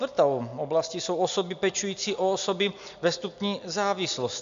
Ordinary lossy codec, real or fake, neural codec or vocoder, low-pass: MP3, 64 kbps; real; none; 7.2 kHz